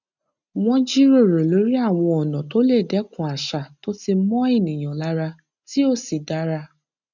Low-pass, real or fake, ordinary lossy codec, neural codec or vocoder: 7.2 kHz; real; none; none